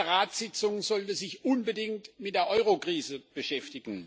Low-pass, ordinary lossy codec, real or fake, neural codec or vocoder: none; none; real; none